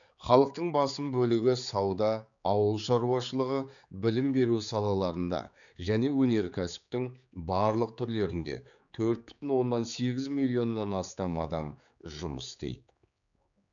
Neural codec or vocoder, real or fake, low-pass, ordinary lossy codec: codec, 16 kHz, 4 kbps, X-Codec, HuBERT features, trained on general audio; fake; 7.2 kHz; none